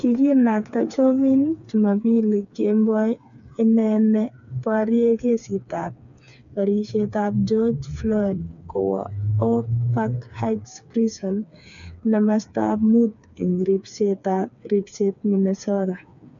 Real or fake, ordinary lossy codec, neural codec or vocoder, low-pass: fake; none; codec, 16 kHz, 4 kbps, FreqCodec, smaller model; 7.2 kHz